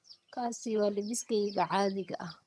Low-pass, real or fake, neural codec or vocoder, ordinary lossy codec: none; fake; vocoder, 22.05 kHz, 80 mel bands, HiFi-GAN; none